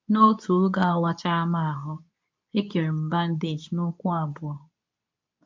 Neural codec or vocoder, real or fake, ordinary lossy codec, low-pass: codec, 24 kHz, 0.9 kbps, WavTokenizer, medium speech release version 2; fake; MP3, 64 kbps; 7.2 kHz